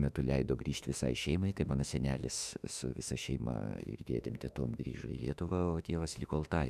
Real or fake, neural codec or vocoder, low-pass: fake; autoencoder, 48 kHz, 32 numbers a frame, DAC-VAE, trained on Japanese speech; 14.4 kHz